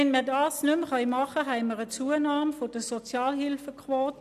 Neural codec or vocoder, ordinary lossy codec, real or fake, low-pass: none; none; real; 14.4 kHz